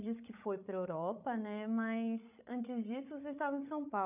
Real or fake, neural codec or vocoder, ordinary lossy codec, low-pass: fake; codec, 16 kHz, 16 kbps, FreqCodec, larger model; none; 3.6 kHz